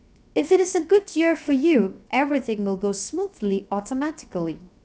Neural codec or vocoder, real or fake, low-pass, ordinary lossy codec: codec, 16 kHz, 0.7 kbps, FocalCodec; fake; none; none